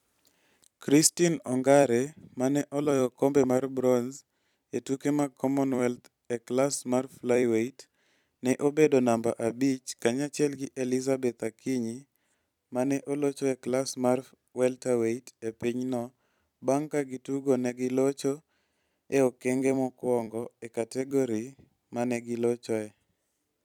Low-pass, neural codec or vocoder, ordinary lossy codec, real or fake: 19.8 kHz; vocoder, 44.1 kHz, 128 mel bands every 256 samples, BigVGAN v2; none; fake